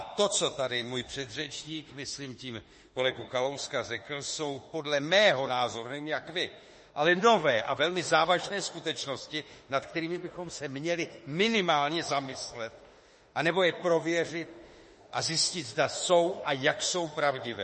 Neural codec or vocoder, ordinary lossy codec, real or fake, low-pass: autoencoder, 48 kHz, 32 numbers a frame, DAC-VAE, trained on Japanese speech; MP3, 32 kbps; fake; 10.8 kHz